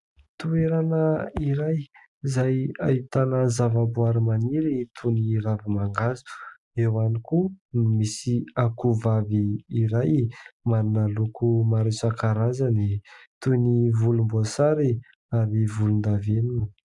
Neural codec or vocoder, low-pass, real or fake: none; 10.8 kHz; real